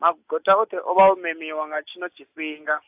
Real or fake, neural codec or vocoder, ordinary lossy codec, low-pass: real; none; AAC, 32 kbps; 3.6 kHz